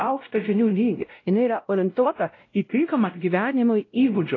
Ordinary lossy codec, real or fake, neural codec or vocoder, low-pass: AAC, 32 kbps; fake; codec, 16 kHz, 0.5 kbps, X-Codec, WavLM features, trained on Multilingual LibriSpeech; 7.2 kHz